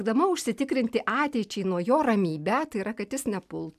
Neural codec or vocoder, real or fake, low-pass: none; real; 14.4 kHz